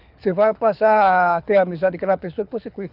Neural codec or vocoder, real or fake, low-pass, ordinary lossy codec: codec, 24 kHz, 6 kbps, HILCodec; fake; 5.4 kHz; none